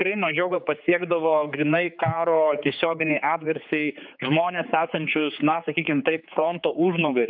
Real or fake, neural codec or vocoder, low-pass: fake; codec, 16 kHz, 4 kbps, X-Codec, HuBERT features, trained on general audio; 5.4 kHz